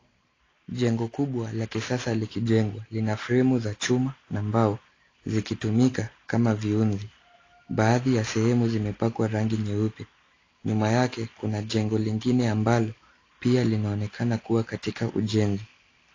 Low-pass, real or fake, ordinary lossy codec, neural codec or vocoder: 7.2 kHz; real; AAC, 32 kbps; none